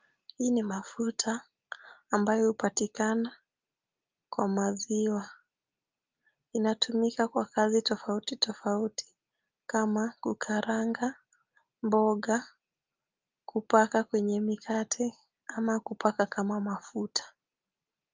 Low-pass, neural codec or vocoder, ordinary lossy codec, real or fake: 7.2 kHz; none; Opus, 24 kbps; real